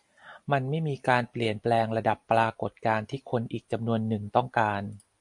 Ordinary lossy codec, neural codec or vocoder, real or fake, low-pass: AAC, 64 kbps; none; real; 10.8 kHz